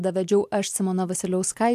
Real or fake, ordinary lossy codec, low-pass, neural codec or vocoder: real; AAC, 96 kbps; 14.4 kHz; none